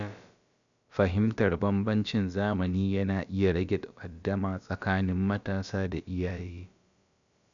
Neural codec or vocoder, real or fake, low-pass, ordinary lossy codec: codec, 16 kHz, about 1 kbps, DyCAST, with the encoder's durations; fake; 7.2 kHz; none